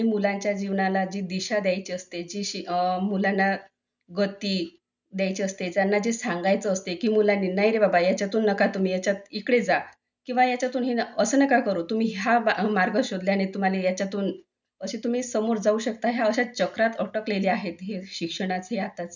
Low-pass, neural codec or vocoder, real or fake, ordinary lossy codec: 7.2 kHz; none; real; none